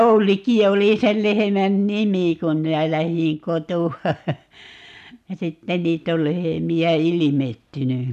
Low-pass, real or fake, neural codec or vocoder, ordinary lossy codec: 14.4 kHz; real; none; none